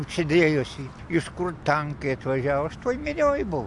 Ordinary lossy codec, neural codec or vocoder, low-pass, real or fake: AAC, 64 kbps; none; 10.8 kHz; real